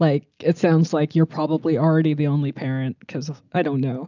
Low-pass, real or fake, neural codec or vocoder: 7.2 kHz; real; none